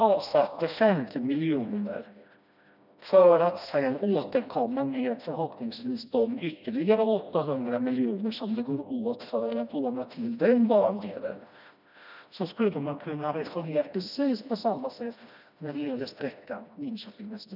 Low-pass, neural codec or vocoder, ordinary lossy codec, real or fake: 5.4 kHz; codec, 16 kHz, 1 kbps, FreqCodec, smaller model; none; fake